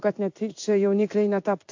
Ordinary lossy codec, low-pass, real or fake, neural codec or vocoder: AAC, 48 kbps; 7.2 kHz; fake; codec, 16 kHz in and 24 kHz out, 1 kbps, XY-Tokenizer